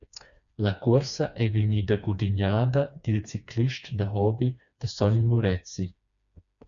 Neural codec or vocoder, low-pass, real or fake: codec, 16 kHz, 2 kbps, FreqCodec, smaller model; 7.2 kHz; fake